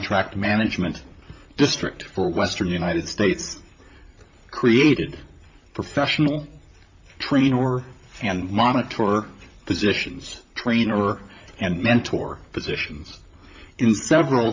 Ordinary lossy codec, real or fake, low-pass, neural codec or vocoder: MP3, 64 kbps; fake; 7.2 kHz; vocoder, 44.1 kHz, 128 mel bands, Pupu-Vocoder